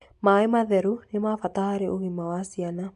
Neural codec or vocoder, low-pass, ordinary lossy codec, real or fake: none; 10.8 kHz; AAC, 64 kbps; real